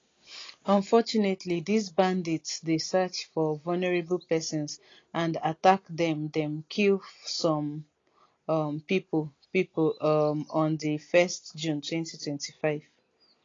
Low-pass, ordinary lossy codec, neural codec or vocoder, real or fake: 7.2 kHz; AAC, 32 kbps; none; real